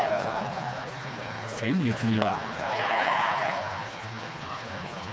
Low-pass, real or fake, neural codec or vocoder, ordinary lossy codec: none; fake; codec, 16 kHz, 2 kbps, FreqCodec, smaller model; none